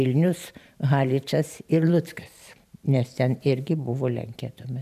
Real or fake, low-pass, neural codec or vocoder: fake; 14.4 kHz; vocoder, 44.1 kHz, 128 mel bands every 512 samples, BigVGAN v2